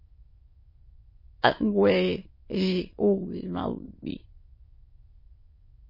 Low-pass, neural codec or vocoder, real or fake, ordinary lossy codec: 5.4 kHz; autoencoder, 22.05 kHz, a latent of 192 numbers a frame, VITS, trained on many speakers; fake; MP3, 24 kbps